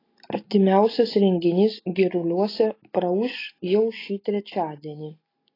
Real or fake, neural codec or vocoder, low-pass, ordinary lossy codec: real; none; 5.4 kHz; AAC, 24 kbps